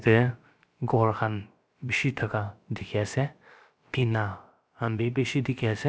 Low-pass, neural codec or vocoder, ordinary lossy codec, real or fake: none; codec, 16 kHz, about 1 kbps, DyCAST, with the encoder's durations; none; fake